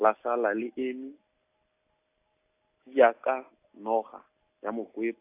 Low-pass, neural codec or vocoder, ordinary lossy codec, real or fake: 3.6 kHz; none; AAC, 24 kbps; real